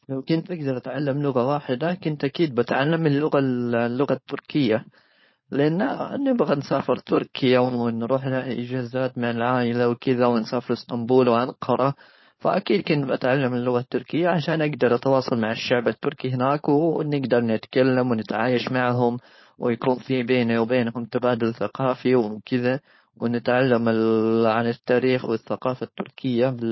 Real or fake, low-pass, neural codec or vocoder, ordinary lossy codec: fake; 7.2 kHz; codec, 16 kHz, 4.8 kbps, FACodec; MP3, 24 kbps